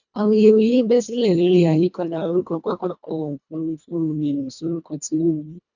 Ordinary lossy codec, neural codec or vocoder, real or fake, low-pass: none; codec, 24 kHz, 1.5 kbps, HILCodec; fake; 7.2 kHz